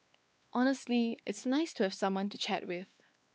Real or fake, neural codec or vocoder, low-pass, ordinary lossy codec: fake; codec, 16 kHz, 2 kbps, X-Codec, WavLM features, trained on Multilingual LibriSpeech; none; none